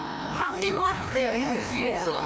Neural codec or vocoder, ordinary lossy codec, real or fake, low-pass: codec, 16 kHz, 1 kbps, FreqCodec, larger model; none; fake; none